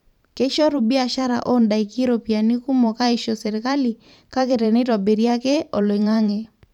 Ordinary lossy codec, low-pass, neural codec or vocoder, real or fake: none; 19.8 kHz; vocoder, 44.1 kHz, 128 mel bands every 512 samples, BigVGAN v2; fake